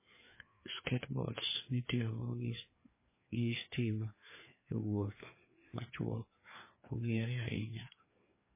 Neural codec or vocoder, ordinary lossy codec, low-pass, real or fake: codec, 16 kHz, 2 kbps, FreqCodec, larger model; MP3, 16 kbps; 3.6 kHz; fake